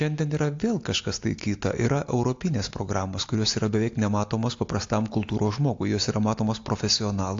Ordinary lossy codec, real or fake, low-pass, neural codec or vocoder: AAC, 48 kbps; real; 7.2 kHz; none